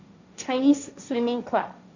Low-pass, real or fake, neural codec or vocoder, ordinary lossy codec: 7.2 kHz; fake; codec, 16 kHz, 1.1 kbps, Voila-Tokenizer; none